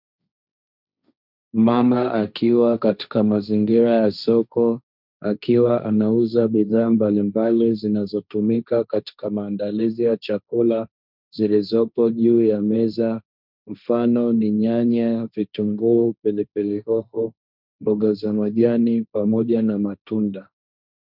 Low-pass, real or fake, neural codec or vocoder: 5.4 kHz; fake; codec, 16 kHz, 1.1 kbps, Voila-Tokenizer